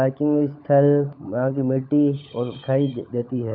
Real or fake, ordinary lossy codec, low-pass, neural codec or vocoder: fake; none; 5.4 kHz; codec, 16 kHz, 16 kbps, FunCodec, trained on LibriTTS, 50 frames a second